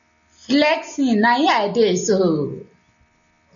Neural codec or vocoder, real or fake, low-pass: none; real; 7.2 kHz